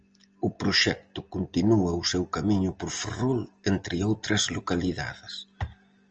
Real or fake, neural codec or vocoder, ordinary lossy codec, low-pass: real; none; Opus, 24 kbps; 7.2 kHz